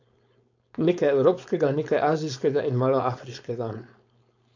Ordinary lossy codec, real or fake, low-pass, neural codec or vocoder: AAC, 48 kbps; fake; 7.2 kHz; codec, 16 kHz, 4.8 kbps, FACodec